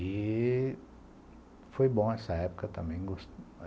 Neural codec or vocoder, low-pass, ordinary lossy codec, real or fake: none; none; none; real